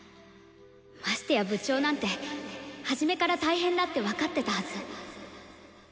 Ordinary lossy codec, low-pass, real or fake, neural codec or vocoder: none; none; real; none